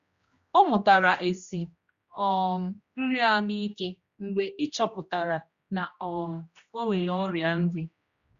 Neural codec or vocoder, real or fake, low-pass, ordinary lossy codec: codec, 16 kHz, 1 kbps, X-Codec, HuBERT features, trained on general audio; fake; 7.2 kHz; Opus, 64 kbps